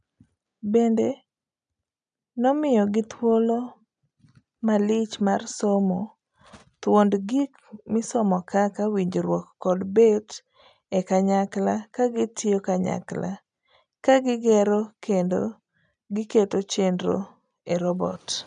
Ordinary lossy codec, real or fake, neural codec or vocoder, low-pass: none; real; none; 9.9 kHz